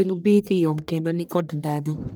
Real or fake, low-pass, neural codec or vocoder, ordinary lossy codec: fake; none; codec, 44.1 kHz, 1.7 kbps, Pupu-Codec; none